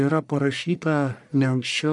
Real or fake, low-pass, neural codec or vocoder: fake; 10.8 kHz; codec, 44.1 kHz, 1.7 kbps, Pupu-Codec